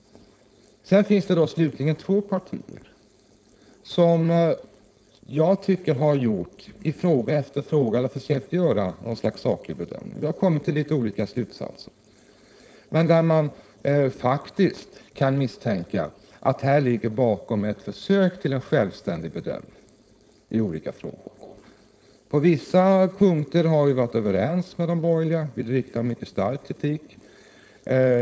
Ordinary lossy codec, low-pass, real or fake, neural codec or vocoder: none; none; fake; codec, 16 kHz, 4.8 kbps, FACodec